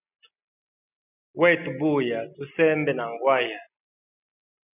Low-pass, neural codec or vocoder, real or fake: 3.6 kHz; none; real